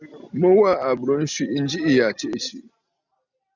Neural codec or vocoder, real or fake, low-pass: none; real; 7.2 kHz